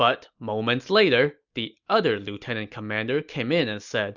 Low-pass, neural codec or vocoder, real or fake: 7.2 kHz; none; real